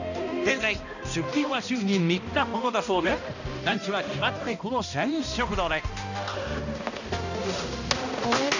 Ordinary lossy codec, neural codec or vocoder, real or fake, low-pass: none; codec, 16 kHz, 1 kbps, X-Codec, HuBERT features, trained on balanced general audio; fake; 7.2 kHz